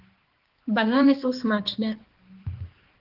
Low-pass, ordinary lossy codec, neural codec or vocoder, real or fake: 5.4 kHz; Opus, 16 kbps; codec, 16 kHz, 2 kbps, X-Codec, HuBERT features, trained on balanced general audio; fake